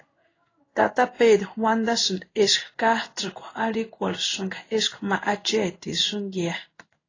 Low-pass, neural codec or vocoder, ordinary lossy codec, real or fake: 7.2 kHz; codec, 16 kHz in and 24 kHz out, 1 kbps, XY-Tokenizer; AAC, 32 kbps; fake